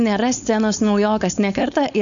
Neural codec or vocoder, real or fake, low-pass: codec, 16 kHz, 4.8 kbps, FACodec; fake; 7.2 kHz